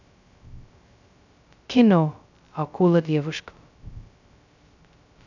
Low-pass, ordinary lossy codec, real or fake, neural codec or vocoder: 7.2 kHz; none; fake; codec, 16 kHz, 0.2 kbps, FocalCodec